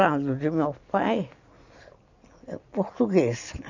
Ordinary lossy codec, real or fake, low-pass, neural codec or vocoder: none; real; 7.2 kHz; none